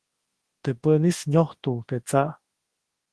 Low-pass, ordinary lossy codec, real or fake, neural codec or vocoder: 10.8 kHz; Opus, 16 kbps; fake; codec, 24 kHz, 0.9 kbps, WavTokenizer, large speech release